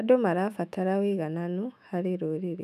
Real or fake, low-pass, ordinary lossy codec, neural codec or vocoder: fake; 14.4 kHz; none; autoencoder, 48 kHz, 128 numbers a frame, DAC-VAE, trained on Japanese speech